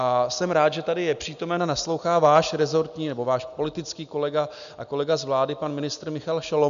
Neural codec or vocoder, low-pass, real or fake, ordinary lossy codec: none; 7.2 kHz; real; MP3, 96 kbps